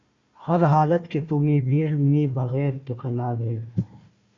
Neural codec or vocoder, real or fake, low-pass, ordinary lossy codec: codec, 16 kHz, 1 kbps, FunCodec, trained on Chinese and English, 50 frames a second; fake; 7.2 kHz; Opus, 64 kbps